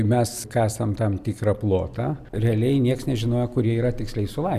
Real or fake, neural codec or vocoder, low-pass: real; none; 14.4 kHz